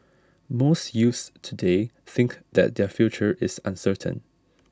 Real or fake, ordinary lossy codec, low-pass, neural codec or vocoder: real; none; none; none